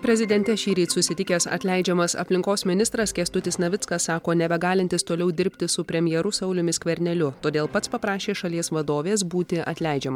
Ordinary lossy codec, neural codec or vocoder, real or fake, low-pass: MP3, 96 kbps; none; real; 19.8 kHz